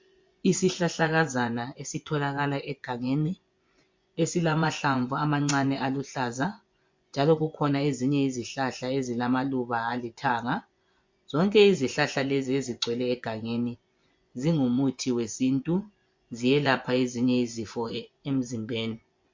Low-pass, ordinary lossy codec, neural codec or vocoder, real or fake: 7.2 kHz; MP3, 48 kbps; vocoder, 24 kHz, 100 mel bands, Vocos; fake